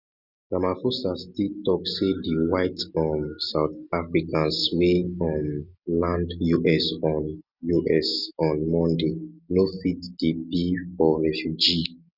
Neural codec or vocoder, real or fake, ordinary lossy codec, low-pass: none; real; none; 5.4 kHz